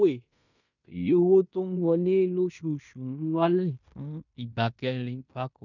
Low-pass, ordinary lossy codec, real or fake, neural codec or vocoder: 7.2 kHz; none; fake; codec, 16 kHz in and 24 kHz out, 0.9 kbps, LongCat-Audio-Codec, fine tuned four codebook decoder